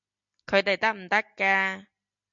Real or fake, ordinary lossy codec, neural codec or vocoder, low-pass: real; MP3, 96 kbps; none; 7.2 kHz